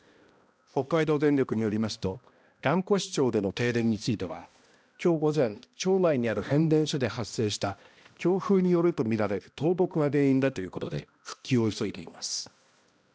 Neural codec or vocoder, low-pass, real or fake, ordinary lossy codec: codec, 16 kHz, 1 kbps, X-Codec, HuBERT features, trained on balanced general audio; none; fake; none